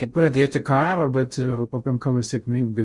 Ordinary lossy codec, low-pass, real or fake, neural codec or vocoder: Opus, 64 kbps; 10.8 kHz; fake; codec, 16 kHz in and 24 kHz out, 0.6 kbps, FocalCodec, streaming, 4096 codes